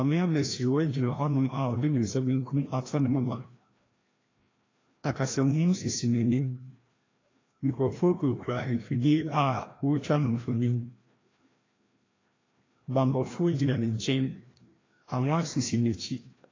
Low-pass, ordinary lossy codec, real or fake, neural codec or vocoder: 7.2 kHz; AAC, 32 kbps; fake; codec, 16 kHz, 1 kbps, FreqCodec, larger model